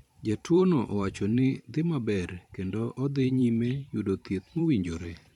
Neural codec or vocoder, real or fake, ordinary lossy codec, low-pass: vocoder, 44.1 kHz, 128 mel bands every 512 samples, BigVGAN v2; fake; none; 19.8 kHz